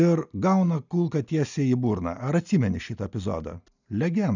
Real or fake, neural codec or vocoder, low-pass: real; none; 7.2 kHz